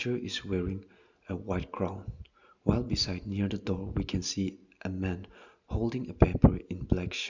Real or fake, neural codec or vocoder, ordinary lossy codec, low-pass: real; none; none; 7.2 kHz